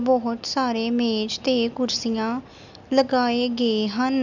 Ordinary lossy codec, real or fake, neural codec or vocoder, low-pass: none; real; none; 7.2 kHz